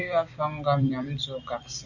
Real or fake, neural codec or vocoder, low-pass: fake; vocoder, 44.1 kHz, 128 mel bands every 256 samples, BigVGAN v2; 7.2 kHz